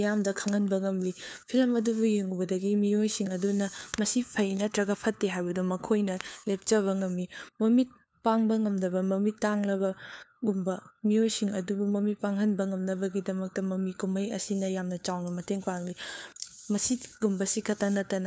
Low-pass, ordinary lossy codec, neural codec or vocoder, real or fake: none; none; codec, 16 kHz, 4 kbps, FunCodec, trained on LibriTTS, 50 frames a second; fake